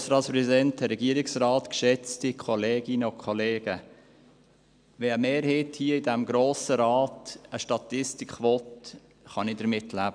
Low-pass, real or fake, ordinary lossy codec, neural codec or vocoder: 9.9 kHz; real; none; none